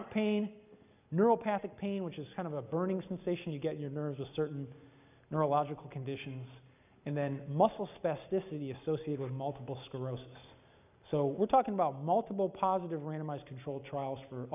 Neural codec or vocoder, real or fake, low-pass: none; real; 3.6 kHz